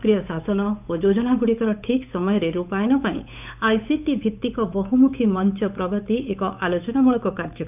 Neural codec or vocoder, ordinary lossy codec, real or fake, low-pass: codec, 16 kHz, 8 kbps, FunCodec, trained on Chinese and English, 25 frames a second; none; fake; 3.6 kHz